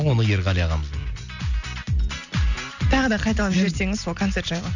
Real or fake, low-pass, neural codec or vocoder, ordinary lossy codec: real; 7.2 kHz; none; none